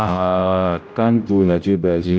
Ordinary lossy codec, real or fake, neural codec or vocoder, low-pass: none; fake; codec, 16 kHz, 0.5 kbps, X-Codec, HuBERT features, trained on general audio; none